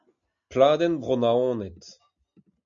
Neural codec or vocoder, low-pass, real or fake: none; 7.2 kHz; real